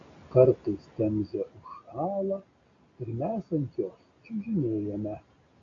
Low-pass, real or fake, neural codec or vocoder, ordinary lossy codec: 7.2 kHz; real; none; MP3, 48 kbps